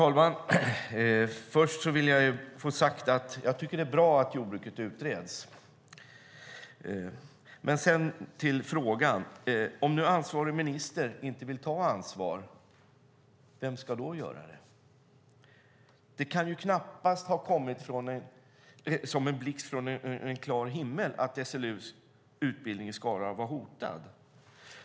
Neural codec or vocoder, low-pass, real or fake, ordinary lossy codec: none; none; real; none